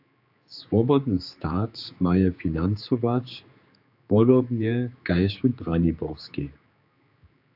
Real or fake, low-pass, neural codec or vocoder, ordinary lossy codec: fake; 5.4 kHz; codec, 16 kHz, 4 kbps, X-Codec, HuBERT features, trained on general audio; AAC, 48 kbps